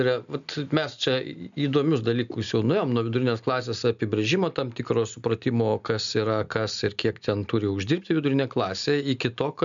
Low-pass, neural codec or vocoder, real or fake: 7.2 kHz; none; real